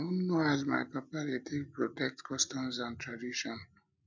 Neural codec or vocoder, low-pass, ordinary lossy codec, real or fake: none; none; none; real